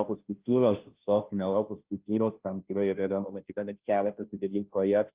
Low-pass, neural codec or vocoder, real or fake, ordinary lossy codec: 3.6 kHz; codec, 16 kHz, 0.5 kbps, FunCodec, trained on Chinese and English, 25 frames a second; fake; Opus, 32 kbps